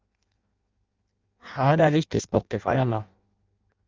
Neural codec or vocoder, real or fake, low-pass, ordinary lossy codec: codec, 16 kHz in and 24 kHz out, 0.6 kbps, FireRedTTS-2 codec; fake; 7.2 kHz; Opus, 32 kbps